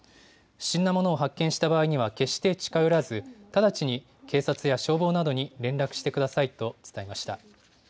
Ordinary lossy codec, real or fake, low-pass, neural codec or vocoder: none; real; none; none